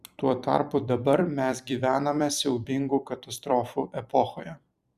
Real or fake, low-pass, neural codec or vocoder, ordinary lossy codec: fake; 14.4 kHz; vocoder, 44.1 kHz, 128 mel bands every 256 samples, BigVGAN v2; Opus, 64 kbps